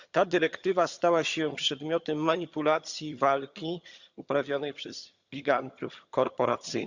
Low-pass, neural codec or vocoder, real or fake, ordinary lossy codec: 7.2 kHz; vocoder, 22.05 kHz, 80 mel bands, HiFi-GAN; fake; Opus, 64 kbps